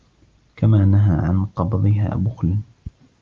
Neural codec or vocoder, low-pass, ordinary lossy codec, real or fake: none; 7.2 kHz; Opus, 32 kbps; real